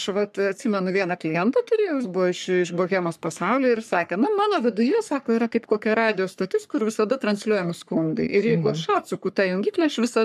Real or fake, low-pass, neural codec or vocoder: fake; 14.4 kHz; codec, 44.1 kHz, 3.4 kbps, Pupu-Codec